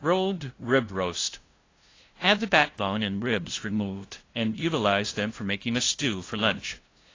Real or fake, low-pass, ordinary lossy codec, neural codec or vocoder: fake; 7.2 kHz; AAC, 32 kbps; codec, 16 kHz, 0.5 kbps, FunCodec, trained on LibriTTS, 25 frames a second